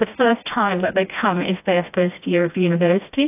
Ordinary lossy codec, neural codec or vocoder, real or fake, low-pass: AAC, 32 kbps; codec, 16 kHz, 1 kbps, FreqCodec, smaller model; fake; 3.6 kHz